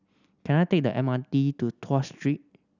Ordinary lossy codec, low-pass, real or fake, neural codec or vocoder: none; 7.2 kHz; real; none